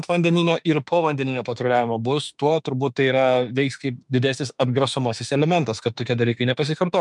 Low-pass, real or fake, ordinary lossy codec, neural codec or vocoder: 10.8 kHz; fake; MP3, 96 kbps; autoencoder, 48 kHz, 32 numbers a frame, DAC-VAE, trained on Japanese speech